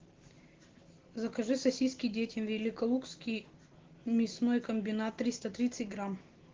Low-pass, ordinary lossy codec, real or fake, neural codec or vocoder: 7.2 kHz; Opus, 16 kbps; real; none